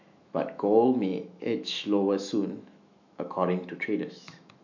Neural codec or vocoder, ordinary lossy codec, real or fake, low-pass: autoencoder, 48 kHz, 128 numbers a frame, DAC-VAE, trained on Japanese speech; none; fake; 7.2 kHz